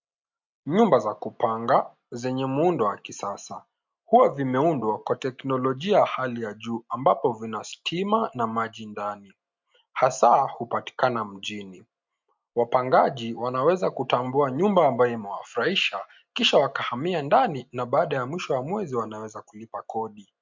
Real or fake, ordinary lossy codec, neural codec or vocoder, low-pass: real; MP3, 64 kbps; none; 7.2 kHz